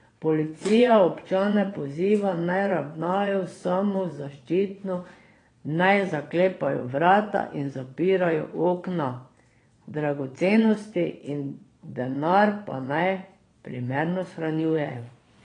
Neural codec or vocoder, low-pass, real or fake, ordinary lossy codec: vocoder, 22.05 kHz, 80 mel bands, Vocos; 9.9 kHz; fake; AAC, 32 kbps